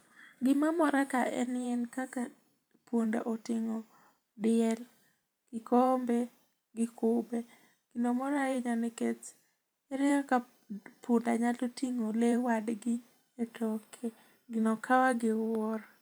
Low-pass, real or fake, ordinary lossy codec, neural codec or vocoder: none; fake; none; vocoder, 44.1 kHz, 128 mel bands every 512 samples, BigVGAN v2